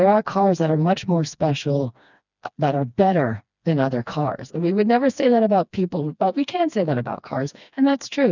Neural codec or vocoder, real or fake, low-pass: codec, 16 kHz, 2 kbps, FreqCodec, smaller model; fake; 7.2 kHz